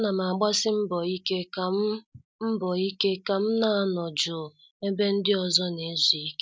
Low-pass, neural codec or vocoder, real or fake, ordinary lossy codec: none; none; real; none